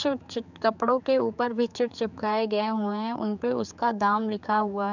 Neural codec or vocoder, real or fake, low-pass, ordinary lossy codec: codec, 16 kHz, 4 kbps, X-Codec, HuBERT features, trained on general audio; fake; 7.2 kHz; none